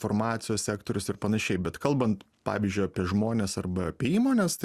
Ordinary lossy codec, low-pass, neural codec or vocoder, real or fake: Opus, 64 kbps; 14.4 kHz; none; real